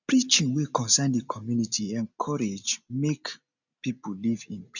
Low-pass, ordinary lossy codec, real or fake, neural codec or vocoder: 7.2 kHz; none; real; none